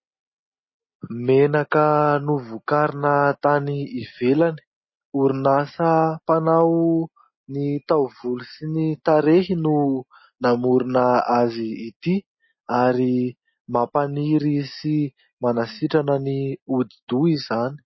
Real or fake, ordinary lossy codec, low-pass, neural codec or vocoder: real; MP3, 24 kbps; 7.2 kHz; none